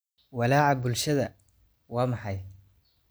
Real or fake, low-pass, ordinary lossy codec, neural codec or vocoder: real; none; none; none